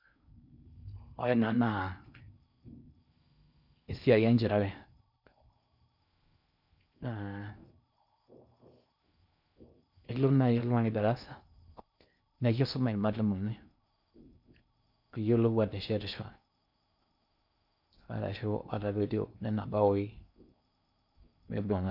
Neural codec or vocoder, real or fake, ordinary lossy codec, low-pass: codec, 16 kHz in and 24 kHz out, 0.6 kbps, FocalCodec, streaming, 4096 codes; fake; none; 5.4 kHz